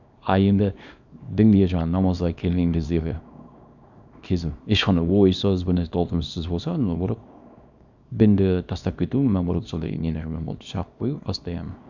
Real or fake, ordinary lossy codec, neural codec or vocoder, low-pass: fake; none; codec, 24 kHz, 0.9 kbps, WavTokenizer, small release; 7.2 kHz